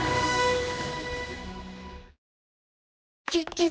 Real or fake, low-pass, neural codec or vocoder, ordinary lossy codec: fake; none; codec, 16 kHz, 2 kbps, X-Codec, HuBERT features, trained on general audio; none